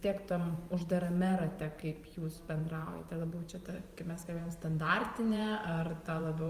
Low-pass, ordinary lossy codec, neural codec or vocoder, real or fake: 14.4 kHz; Opus, 32 kbps; vocoder, 44.1 kHz, 128 mel bands every 512 samples, BigVGAN v2; fake